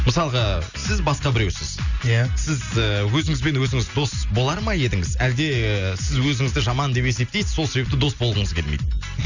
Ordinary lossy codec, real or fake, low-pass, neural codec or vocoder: none; real; 7.2 kHz; none